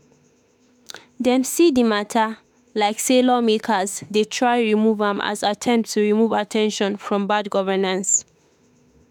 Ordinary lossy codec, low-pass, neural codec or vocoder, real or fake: none; none; autoencoder, 48 kHz, 32 numbers a frame, DAC-VAE, trained on Japanese speech; fake